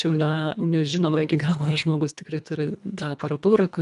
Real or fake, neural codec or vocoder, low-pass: fake; codec, 24 kHz, 1.5 kbps, HILCodec; 10.8 kHz